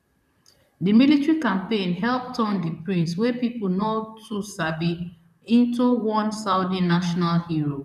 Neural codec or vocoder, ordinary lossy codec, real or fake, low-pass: vocoder, 44.1 kHz, 128 mel bands, Pupu-Vocoder; none; fake; 14.4 kHz